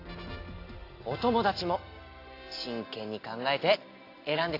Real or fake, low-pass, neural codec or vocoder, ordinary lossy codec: real; 5.4 kHz; none; AAC, 32 kbps